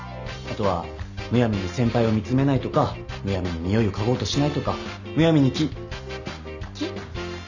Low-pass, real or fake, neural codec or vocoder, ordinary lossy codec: 7.2 kHz; real; none; none